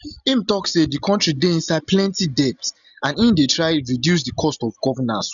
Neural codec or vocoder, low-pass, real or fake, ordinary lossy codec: none; 7.2 kHz; real; none